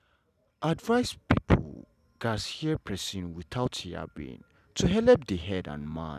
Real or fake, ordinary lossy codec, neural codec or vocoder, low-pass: real; none; none; 14.4 kHz